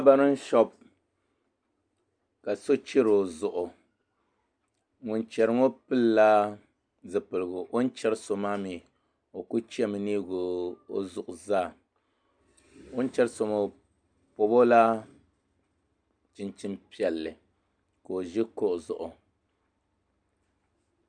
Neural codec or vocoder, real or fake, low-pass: none; real; 9.9 kHz